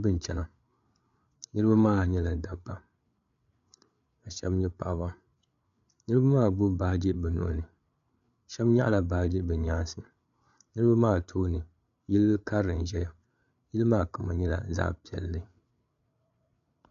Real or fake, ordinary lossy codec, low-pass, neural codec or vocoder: fake; MP3, 96 kbps; 7.2 kHz; codec, 16 kHz, 8 kbps, FreqCodec, larger model